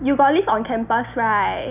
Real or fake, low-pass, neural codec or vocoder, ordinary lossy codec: real; 3.6 kHz; none; none